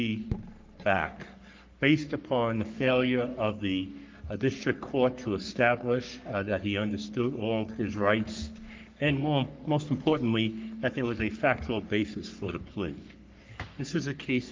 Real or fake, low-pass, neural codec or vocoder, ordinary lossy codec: fake; 7.2 kHz; codec, 44.1 kHz, 3.4 kbps, Pupu-Codec; Opus, 24 kbps